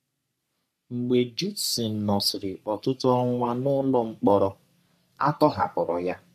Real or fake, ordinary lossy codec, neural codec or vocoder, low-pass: fake; none; codec, 44.1 kHz, 3.4 kbps, Pupu-Codec; 14.4 kHz